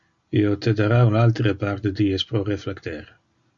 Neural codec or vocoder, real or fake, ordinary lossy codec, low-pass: none; real; Opus, 64 kbps; 7.2 kHz